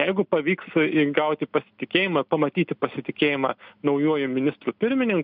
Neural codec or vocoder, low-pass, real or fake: none; 5.4 kHz; real